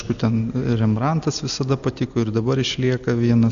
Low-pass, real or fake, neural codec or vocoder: 7.2 kHz; real; none